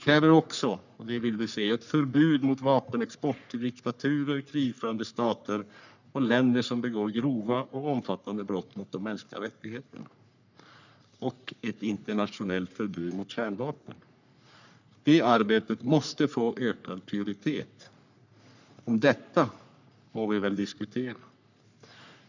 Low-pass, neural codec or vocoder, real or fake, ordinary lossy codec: 7.2 kHz; codec, 44.1 kHz, 3.4 kbps, Pupu-Codec; fake; none